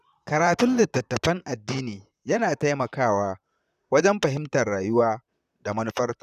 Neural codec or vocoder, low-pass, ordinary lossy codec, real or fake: vocoder, 44.1 kHz, 128 mel bands, Pupu-Vocoder; 14.4 kHz; none; fake